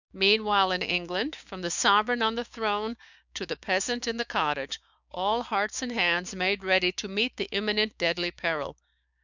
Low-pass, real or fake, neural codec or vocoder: 7.2 kHz; fake; codec, 16 kHz, 6 kbps, DAC